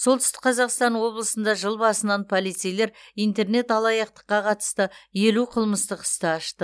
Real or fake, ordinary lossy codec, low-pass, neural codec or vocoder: real; none; none; none